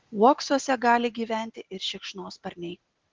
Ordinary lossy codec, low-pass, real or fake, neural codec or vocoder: Opus, 16 kbps; 7.2 kHz; real; none